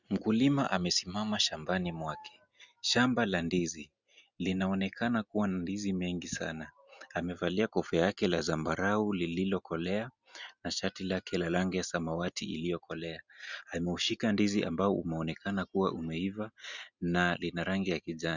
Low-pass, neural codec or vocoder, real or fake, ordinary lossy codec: 7.2 kHz; none; real; Opus, 64 kbps